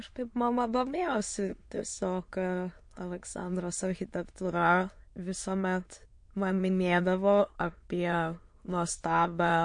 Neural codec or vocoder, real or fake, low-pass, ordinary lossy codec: autoencoder, 22.05 kHz, a latent of 192 numbers a frame, VITS, trained on many speakers; fake; 9.9 kHz; MP3, 48 kbps